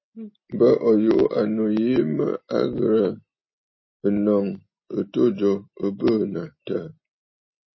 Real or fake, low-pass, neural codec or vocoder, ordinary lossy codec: real; 7.2 kHz; none; MP3, 24 kbps